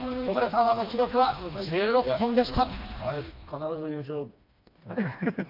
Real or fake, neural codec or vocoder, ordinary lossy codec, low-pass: fake; codec, 16 kHz, 2 kbps, FreqCodec, smaller model; MP3, 48 kbps; 5.4 kHz